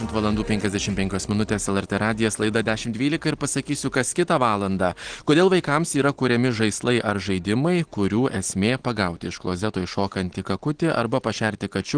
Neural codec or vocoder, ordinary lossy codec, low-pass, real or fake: none; Opus, 16 kbps; 9.9 kHz; real